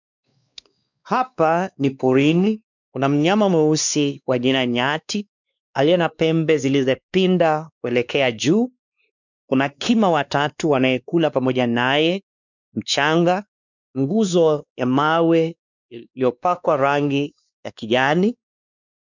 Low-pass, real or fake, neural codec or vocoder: 7.2 kHz; fake; codec, 16 kHz, 2 kbps, X-Codec, WavLM features, trained on Multilingual LibriSpeech